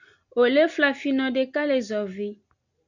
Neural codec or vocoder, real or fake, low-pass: none; real; 7.2 kHz